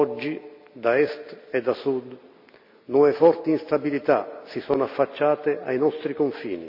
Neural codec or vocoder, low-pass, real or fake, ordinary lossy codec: none; 5.4 kHz; real; none